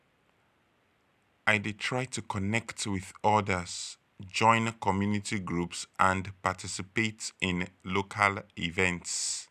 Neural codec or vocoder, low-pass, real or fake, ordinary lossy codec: none; 14.4 kHz; real; none